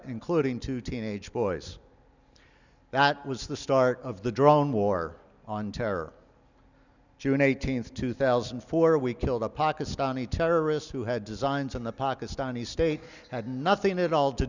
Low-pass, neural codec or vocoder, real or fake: 7.2 kHz; none; real